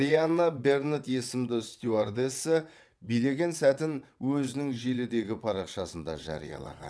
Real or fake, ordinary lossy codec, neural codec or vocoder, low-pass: fake; none; vocoder, 22.05 kHz, 80 mel bands, WaveNeXt; none